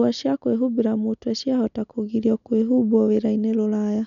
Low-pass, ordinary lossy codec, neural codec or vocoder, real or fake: 7.2 kHz; none; none; real